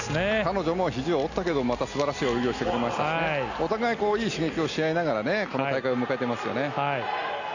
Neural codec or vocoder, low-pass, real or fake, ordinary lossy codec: none; 7.2 kHz; real; none